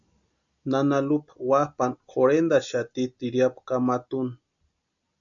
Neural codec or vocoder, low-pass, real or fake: none; 7.2 kHz; real